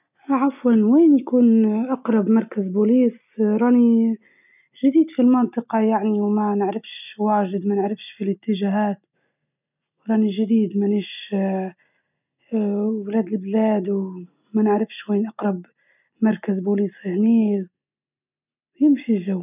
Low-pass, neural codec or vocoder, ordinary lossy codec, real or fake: 3.6 kHz; none; none; real